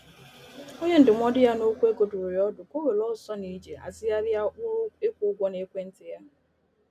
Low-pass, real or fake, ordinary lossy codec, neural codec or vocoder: 14.4 kHz; real; none; none